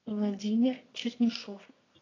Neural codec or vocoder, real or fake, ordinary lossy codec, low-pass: codec, 24 kHz, 0.9 kbps, WavTokenizer, medium music audio release; fake; AAC, 32 kbps; 7.2 kHz